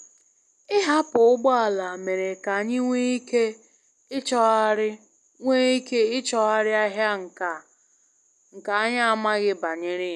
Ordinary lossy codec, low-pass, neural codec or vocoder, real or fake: none; none; none; real